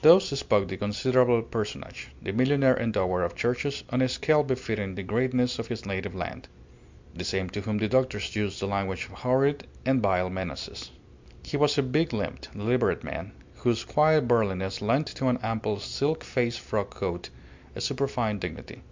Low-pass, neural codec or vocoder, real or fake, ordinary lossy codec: 7.2 kHz; none; real; MP3, 64 kbps